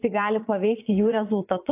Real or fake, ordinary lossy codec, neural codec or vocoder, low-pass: fake; AAC, 24 kbps; vocoder, 22.05 kHz, 80 mel bands, Vocos; 3.6 kHz